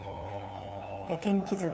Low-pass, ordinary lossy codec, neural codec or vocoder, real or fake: none; none; codec, 16 kHz, 2 kbps, FunCodec, trained on LibriTTS, 25 frames a second; fake